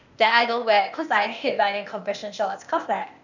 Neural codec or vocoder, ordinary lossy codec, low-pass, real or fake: codec, 16 kHz, 0.8 kbps, ZipCodec; none; 7.2 kHz; fake